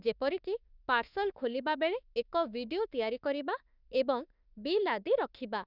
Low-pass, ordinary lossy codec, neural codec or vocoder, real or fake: 5.4 kHz; none; autoencoder, 48 kHz, 32 numbers a frame, DAC-VAE, trained on Japanese speech; fake